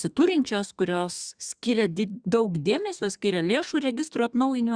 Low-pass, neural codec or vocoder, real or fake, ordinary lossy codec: 9.9 kHz; codec, 32 kHz, 1.9 kbps, SNAC; fake; Opus, 64 kbps